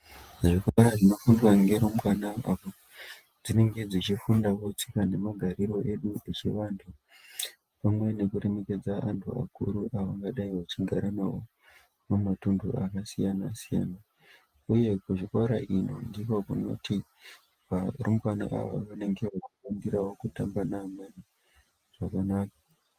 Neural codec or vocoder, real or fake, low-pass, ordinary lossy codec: vocoder, 48 kHz, 128 mel bands, Vocos; fake; 14.4 kHz; Opus, 32 kbps